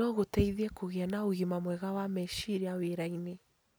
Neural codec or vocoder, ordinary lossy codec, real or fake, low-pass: none; none; real; none